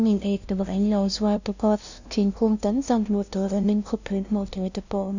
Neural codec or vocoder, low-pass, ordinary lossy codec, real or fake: codec, 16 kHz, 0.5 kbps, FunCodec, trained on LibriTTS, 25 frames a second; 7.2 kHz; none; fake